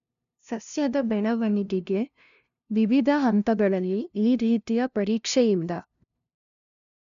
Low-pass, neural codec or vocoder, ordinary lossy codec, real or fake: 7.2 kHz; codec, 16 kHz, 0.5 kbps, FunCodec, trained on LibriTTS, 25 frames a second; none; fake